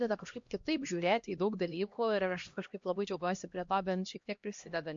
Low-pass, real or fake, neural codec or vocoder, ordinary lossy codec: 7.2 kHz; fake; codec, 16 kHz, 1 kbps, X-Codec, HuBERT features, trained on LibriSpeech; MP3, 48 kbps